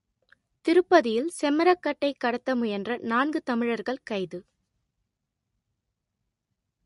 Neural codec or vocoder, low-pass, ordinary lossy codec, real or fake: none; 14.4 kHz; MP3, 48 kbps; real